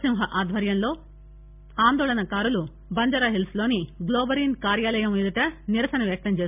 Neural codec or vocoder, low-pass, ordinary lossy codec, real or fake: none; 3.6 kHz; MP3, 32 kbps; real